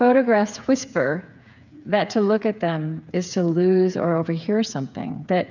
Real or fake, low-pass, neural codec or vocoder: fake; 7.2 kHz; codec, 16 kHz, 8 kbps, FreqCodec, smaller model